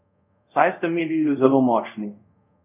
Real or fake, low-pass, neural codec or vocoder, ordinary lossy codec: fake; 3.6 kHz; codec, 24 kHz, 0.5 kbps, DualCodec; none